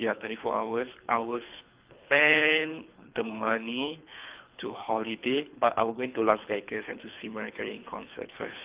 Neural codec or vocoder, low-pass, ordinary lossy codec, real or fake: codec, 24 kHz, 3 kbps, HILCodec; 3.6 kHz; Opus, 24 kbps; fake